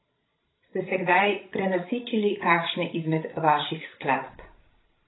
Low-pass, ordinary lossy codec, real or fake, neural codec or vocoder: 7.2 kHz; AAC, 16 kbps; fake; codec, 16 kHz, 16 kbps, FreqCodec, larger model